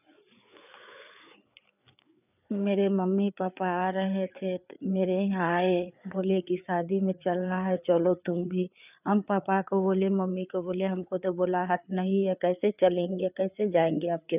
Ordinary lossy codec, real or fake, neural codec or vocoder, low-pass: none; fake; codec, 16 kHz, 4 kbps, FreqCodec, larger model; 3.6 kHz